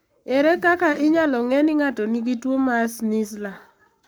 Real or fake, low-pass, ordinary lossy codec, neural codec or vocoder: fake; none; none; codec, 44.1 kHz, 7.8 kbps, Pupu-Codec